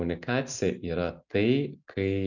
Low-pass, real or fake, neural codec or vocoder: 7.2 kHz; real; none